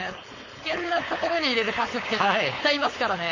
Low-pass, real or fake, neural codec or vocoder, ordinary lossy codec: 7.2 kHz; fake; codec, 16 kHz, 4.8 kbps, FACodec; MP3, 32 kbps